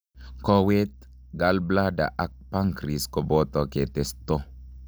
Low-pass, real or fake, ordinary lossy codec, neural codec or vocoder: none; real; none; none